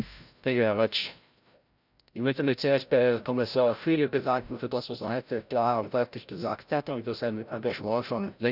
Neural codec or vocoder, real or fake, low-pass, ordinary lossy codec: codec, 16 kHz, 0.5 kbps, FreqCodec, larger model; fake; 5.4 kHz; none